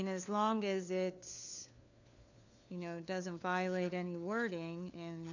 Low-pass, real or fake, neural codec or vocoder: 7.2 kHz; fake; codec, 16 kHz, 4 kbps, FunCodec, trained on LibriTTS, 50 frames a second